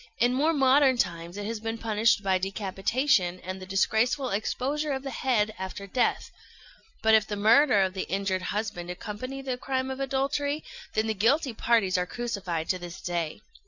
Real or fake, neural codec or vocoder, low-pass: real; none; 7.2 kHz